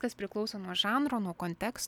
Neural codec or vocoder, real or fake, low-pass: none; real; 19.8 kHz